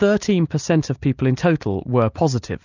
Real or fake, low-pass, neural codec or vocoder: real; 7.2 kHz; none